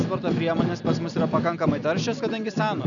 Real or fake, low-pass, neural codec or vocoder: real; 7.2 kHz; none